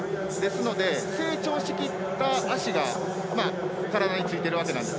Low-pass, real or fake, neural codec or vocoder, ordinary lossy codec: none; real; none; none